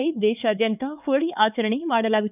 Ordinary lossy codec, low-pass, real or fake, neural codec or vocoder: none; 3.6 kHz; fake; codec, 16 kHz, 4 kbps, X-Codec, HuBERT features, trained on LibriSpeech